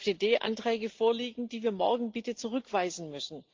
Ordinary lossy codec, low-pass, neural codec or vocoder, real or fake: Opus, 32 kbps; 7.2 kHz; none; real